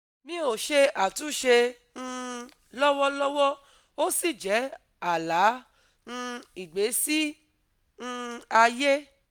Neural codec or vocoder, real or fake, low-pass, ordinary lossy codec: none; real; none; none